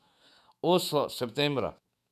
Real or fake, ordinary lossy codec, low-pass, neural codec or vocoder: fake; none; 14.4 kHz; autoencoder, 48 kHz, 128 numbers a frame, DAC-VAE, trained on Japanese speech